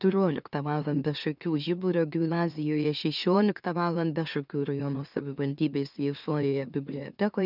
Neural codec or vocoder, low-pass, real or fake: autoencoder, 44.1 kHz, a latent of 192 numbers a frame, MeloTTS; 5.4 kHz; fake